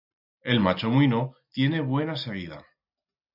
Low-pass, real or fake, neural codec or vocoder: 5.4 kHz; real; none